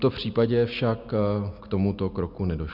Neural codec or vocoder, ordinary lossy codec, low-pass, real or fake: none; Opus, 64 kbps; 5.4 kHz; real